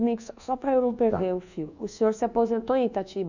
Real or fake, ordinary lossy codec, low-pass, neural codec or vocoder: fake; none; 7.2 kHz; codec, 24 kHz, 1.2 kbps, DualCodec